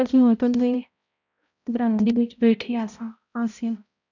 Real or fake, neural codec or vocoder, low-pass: fake; codec, 16 kHz, 0.5 kbps, X-Codec, HuBERT features, trained on balanced general audio; 7.2 kHz